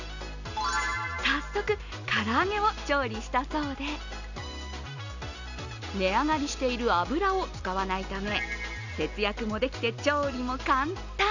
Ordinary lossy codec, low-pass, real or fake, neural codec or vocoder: none; 7.2 kHz; real; none